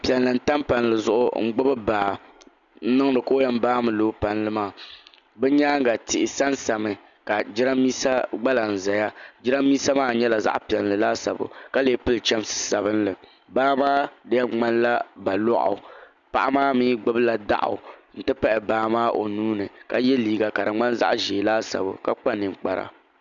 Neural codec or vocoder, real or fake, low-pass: none; real; 7.2 kHz